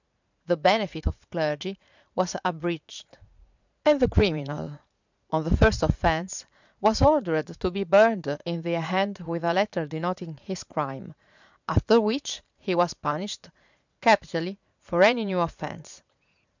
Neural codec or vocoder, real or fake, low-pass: vocoder, 44.1 kHz, 80 mel bands, Vocos; fake; 7.2 kHz